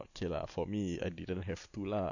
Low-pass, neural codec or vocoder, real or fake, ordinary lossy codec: 7.2 kHz; none; real; none